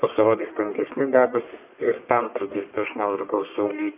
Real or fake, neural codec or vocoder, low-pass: fake; codec, 44.1 kHz, 1.7 kbps, Pupu-Codec; 3.6 kHz